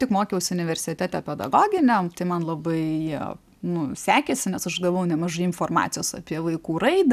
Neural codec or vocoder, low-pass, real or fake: none; 14.4 kHz; real